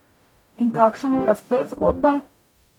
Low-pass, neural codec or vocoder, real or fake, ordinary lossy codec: 19.8 kHz; codec, 44.1 kHz, 0.9 kbps, DAC; fake; none